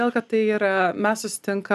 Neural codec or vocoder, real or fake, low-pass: none; real; 14.4 kHz